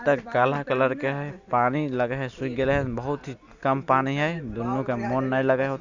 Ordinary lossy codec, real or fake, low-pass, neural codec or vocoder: Opus, 64 kbps; real; 7.2 kHz; none